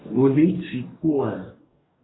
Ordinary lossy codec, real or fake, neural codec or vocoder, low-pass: AAC, 16 kbps; fake; codec, 44.1 kHz, 2.6 kbps, DAC; 7.2 kHz